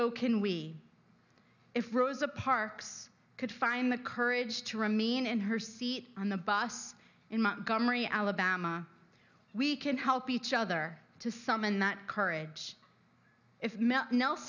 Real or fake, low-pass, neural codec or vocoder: real; 7.2 kHz; none